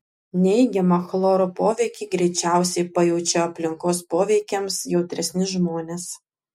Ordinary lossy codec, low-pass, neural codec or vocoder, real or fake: MP3, 64 kbps; 19.8 kHz; none; real